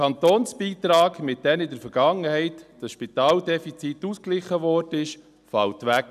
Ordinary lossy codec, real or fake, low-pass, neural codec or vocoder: none; real; 14.4 kHz; none